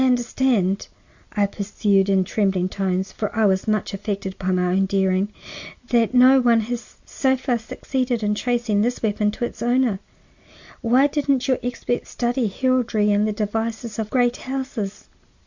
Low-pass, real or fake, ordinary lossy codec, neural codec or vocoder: 7.2 kHz; real; Opus, 64 kbps; none